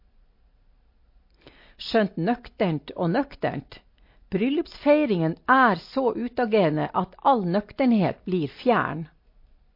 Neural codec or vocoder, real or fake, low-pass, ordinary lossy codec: none; real; 5.4 kHz; MP3, 32 kbps